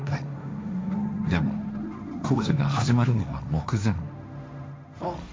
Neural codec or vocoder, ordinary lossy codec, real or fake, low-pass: codec, 16 kHz, 1.1 kbps, Voila-Tokenizer; none; fake; none